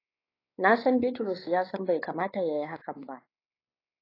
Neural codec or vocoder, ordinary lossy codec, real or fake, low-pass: codec, 16 kHz, 4 kbps, X-Codec, WavLM features, trained on Multilingual LibriSpeech; AAC, 24 kbps; fake; 5.4 kHz